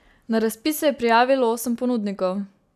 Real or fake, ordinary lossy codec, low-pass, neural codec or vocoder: real; none; 14.4 kHz; none